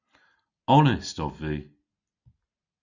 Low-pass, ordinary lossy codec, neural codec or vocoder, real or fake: 7.2 kHz; Opus, 64 kbps; none; real